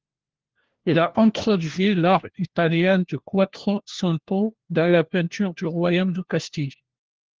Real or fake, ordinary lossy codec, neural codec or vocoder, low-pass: fake; Opus, 16 kbps; codec, 16 kHz, 1 kbps, FunCodec, trained on LibriTTS, 50 frames a second; 7.2 kHz